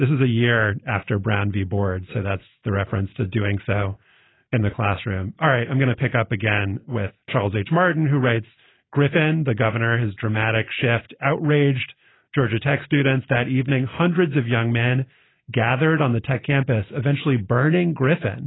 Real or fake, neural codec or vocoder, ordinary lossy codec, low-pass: real; none; AAC, 16 kbps; 7.2 kHz